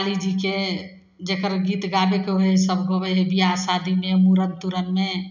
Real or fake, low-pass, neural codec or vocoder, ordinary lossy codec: real; 7.2 kHz; none; none